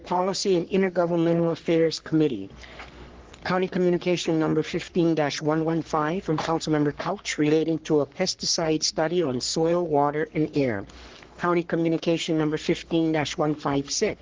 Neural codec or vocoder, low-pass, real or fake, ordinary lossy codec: codec, 44.1 kHz, 3.4 kbps, Pupu-Codec; 7.2 kHz; fake; Opus, 16 kbps